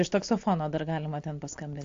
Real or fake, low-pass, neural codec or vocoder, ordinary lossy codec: real; 7.2 kHz; none; AAC, 48 kbps